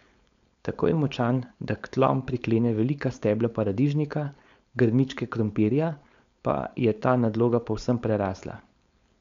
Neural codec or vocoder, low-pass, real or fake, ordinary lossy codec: codec, 16 kHz, 4.8 kbps, FACodec; 7.2 kHz; fake; MP3, 64 kbps